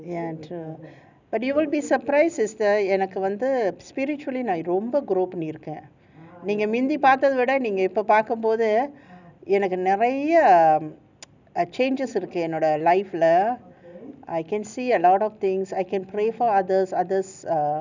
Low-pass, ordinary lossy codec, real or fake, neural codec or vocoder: 7.2 kHz; none; real; none